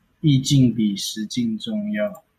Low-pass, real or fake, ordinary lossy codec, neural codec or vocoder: 14.4 kHz; real; MP3, 96 kbps; none